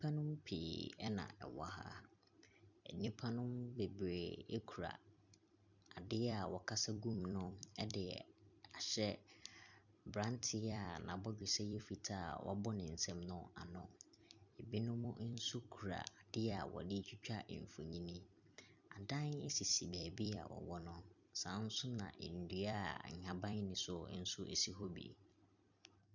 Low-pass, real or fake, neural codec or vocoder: 7.2 kHz; fake; vocoder, 44.1 kHz, 128 mel bands every 256 samples, BigVGAN v2